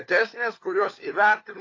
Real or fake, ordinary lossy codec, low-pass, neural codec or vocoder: fake; AAC, 32 kbps; 7.2 kHz; codec, 16 kHz, 4 kbps, FunCodec, trained on LibriTTS, 50 frames a second